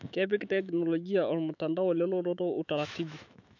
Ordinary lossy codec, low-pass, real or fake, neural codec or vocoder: none; 7.2 kHz; fake; autoencoder, 48 kHz, 128 numbers a frame, DAC-VAE, trained on Japanese speech